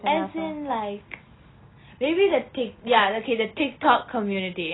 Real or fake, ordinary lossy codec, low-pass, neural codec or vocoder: real; AAC, 16 kbps; 7.2 kHz; none